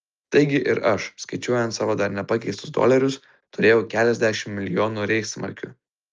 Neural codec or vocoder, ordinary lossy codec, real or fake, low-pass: none; Opus, 24 kbps; real; 7.2 kHz